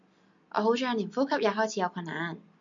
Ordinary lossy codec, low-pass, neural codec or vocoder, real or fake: MP3, 48 kbps; 7.2 kHz; none; real